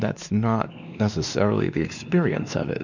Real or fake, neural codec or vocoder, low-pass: fake; codec, 16 kHz, 2 kbps, X-Codec, WavLM features, trained on Multilingual LibriSpeech; 7.2 kHz